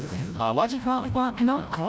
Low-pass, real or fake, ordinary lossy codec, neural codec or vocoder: none; fake; none; codec, 16 kHz, 0.5 kbps, FreqCodec, larger model